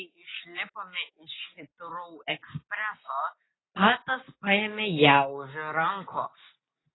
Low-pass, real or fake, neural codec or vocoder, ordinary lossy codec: 7.2 kHz; real; none; AAC, 16 kbps